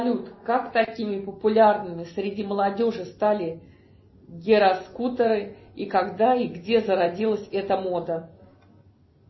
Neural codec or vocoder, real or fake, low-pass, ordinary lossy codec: none; real; 7.2 kHz; MP3, 24 kbps